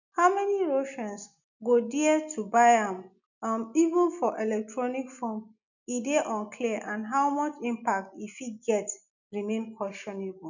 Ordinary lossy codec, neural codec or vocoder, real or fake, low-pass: none; none; real; 7.2 kHz